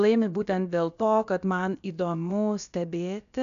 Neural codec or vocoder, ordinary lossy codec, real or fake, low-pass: codec, 16 kHz, about 1 kbps, DyCAST, with the encoder's durations; MP3, 96 kbps; fake; 7.2 kHz